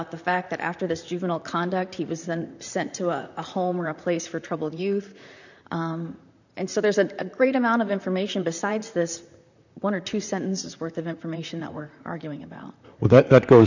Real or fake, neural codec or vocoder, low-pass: fake; vocoder, 44.1 kHz, 128 mel bands, Pupu-Vocoder; 7.2 kHz